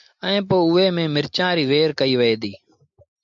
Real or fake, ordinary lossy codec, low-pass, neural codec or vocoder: real; MP3, 64 kbps; 7.2 kHz; none